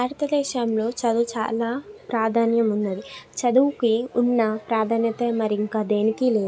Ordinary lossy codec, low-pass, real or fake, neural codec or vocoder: none; none; real; none